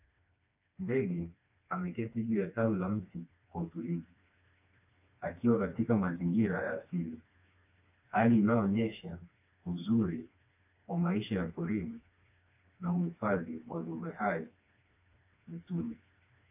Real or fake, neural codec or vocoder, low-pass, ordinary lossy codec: fake; codec, 16 kHz, 2 kbps, FreqCodec, smaller model; 3.6 kHz; AAC, 32 kbps